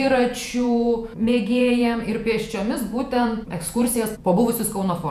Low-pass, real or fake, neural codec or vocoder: 14.4 kHz; fake; vocoder, 48 kHz, 128 mel bands, Vocos